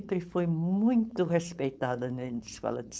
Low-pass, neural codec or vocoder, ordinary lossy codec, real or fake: none; codec, 16 kHz, 4.8 kbps, FACodec; none; fake